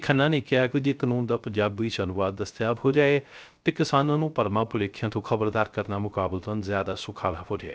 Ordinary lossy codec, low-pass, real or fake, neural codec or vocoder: none; none; fake; codec, 16 kHz, 0.3 kbps, FocalCodec